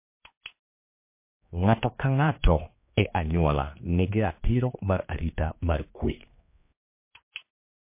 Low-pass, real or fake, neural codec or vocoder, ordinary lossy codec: 3.6 kHz; fake; codec, 16 kHz, 2 kbps, X-Codec, HuBERT features, trained on general audio; MP3, 24 kbps